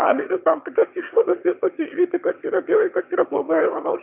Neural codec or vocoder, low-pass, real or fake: autoencoder, 22.05 kHz, a latent of 192 numbers a frame, VITS, trained on one speaker; 3.6 kHz; fake